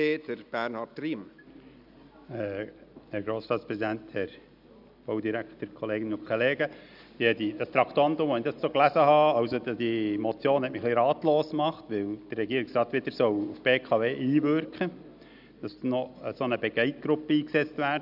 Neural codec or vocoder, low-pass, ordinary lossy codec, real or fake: none; 5.4 kHz; none; real